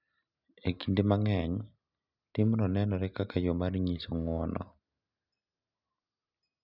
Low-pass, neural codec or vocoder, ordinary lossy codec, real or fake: 5.4 kHz; none; none; real